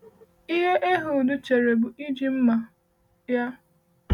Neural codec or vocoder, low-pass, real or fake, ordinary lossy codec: none; 19.8 kHz; real; none